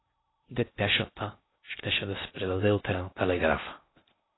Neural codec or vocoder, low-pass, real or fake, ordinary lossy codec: codec, 16 kHz in and 24 kHz out, 0.6 kbps, FocalCodec, streaming, 2048 codes; 7.2 kHz; fake; AAC, 16 kbps